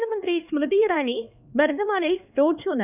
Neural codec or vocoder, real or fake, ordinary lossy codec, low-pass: codec, 16 kHz, 2 kbps, X-Codec, HuBERT features, trained on LibriSpeech; fake; none; 3.6 kHz